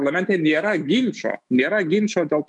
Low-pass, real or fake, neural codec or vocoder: 10.8 kHz; fake; autoencoder, 48 kHz, 128 numbers a frame, DAC-VAE, trained on Japanese speech